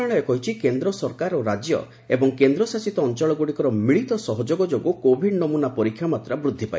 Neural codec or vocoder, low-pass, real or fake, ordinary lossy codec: none; none; real; none